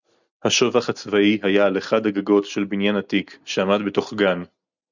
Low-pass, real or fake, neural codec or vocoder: 7.2 kHz; real; none